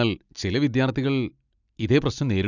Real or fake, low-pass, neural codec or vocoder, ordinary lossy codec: real; 7.2 kHz; none; none